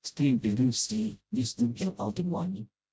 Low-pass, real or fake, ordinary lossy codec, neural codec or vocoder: none; fake; none; codec, 16 kHz, 0.5 kbps, FreqCodec, smaller model